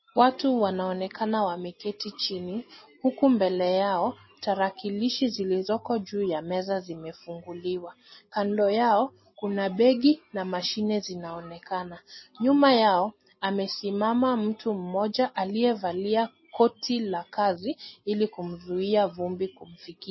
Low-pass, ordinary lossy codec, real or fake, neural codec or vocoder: 7.2 kHz; MP3, 24 kbps; real; none